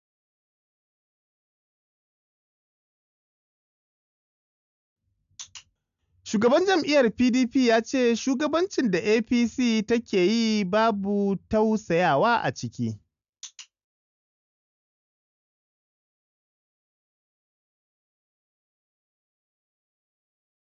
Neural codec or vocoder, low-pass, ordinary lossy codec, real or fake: none; 7.2 kHz; MP3, 96 kbps; real